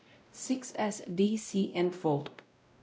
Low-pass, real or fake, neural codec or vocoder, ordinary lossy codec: none; fake; codec, 16 kHz, 0.5 kbps, X-Codec, WavLM features, trained on Multilingual LibriSpeech; none